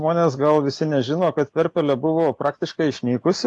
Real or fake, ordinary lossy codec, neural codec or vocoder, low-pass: real; AAC, 64 kbps; none; 10.8 kHz